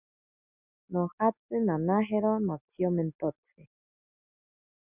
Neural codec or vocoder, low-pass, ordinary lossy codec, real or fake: none; 3.6 kHz; Opus, 64 kbps; real